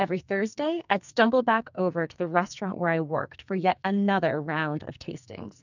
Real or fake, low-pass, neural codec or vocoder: fake; 7.2 kHz; codec, 44.1 kHz, 2.6 kbps, SNAC